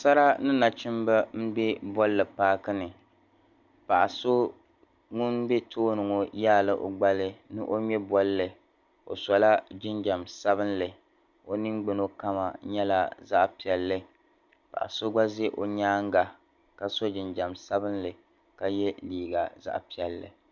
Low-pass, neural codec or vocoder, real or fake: 7.2 kHz; none; real